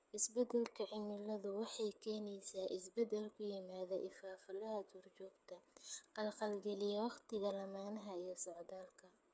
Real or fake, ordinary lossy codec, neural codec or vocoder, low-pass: fake; none; codec, 16 kHz, 8 kbps, FreqCodec, smaller model; none